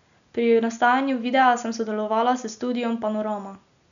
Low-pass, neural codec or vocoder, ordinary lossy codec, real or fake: 7.2 kHz; none; none; real